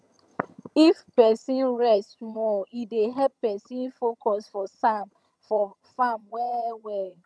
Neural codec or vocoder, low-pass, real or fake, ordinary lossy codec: vocoder, 22.05 kHz, 80 mel bands, HiFi-GAN; none; fake; none